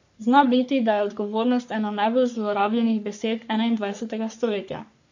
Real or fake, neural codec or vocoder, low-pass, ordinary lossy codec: fake; codec, 44.1 kHz, 3.4 kbps, Pupu-Codec; 7.2 kHz; none